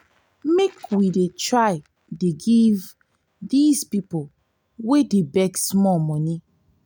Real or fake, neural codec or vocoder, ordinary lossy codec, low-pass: real; none; none; none